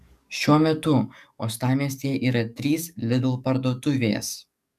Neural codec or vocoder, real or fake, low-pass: codec, 44.1 kHz, 7.8 kbps, DAC; fake; 14.4 kHz